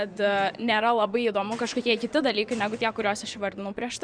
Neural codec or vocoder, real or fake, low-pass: vocoder, 24 kHz, 100 mel bands, Vocos; fake; 9.9 kHz